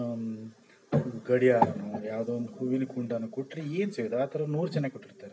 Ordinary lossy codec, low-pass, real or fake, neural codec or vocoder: none; none; real; none